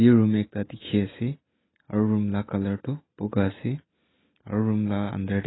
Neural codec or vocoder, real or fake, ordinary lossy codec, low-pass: none; real; AAC, 16 kbps; 7.2 kHz